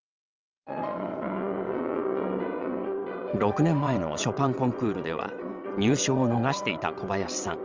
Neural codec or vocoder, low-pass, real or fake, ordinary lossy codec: vocoder, 22.05 kHz, 80 mel bands, WaveNeXt; 7.2 kHz; fake; Opus, 32 kbps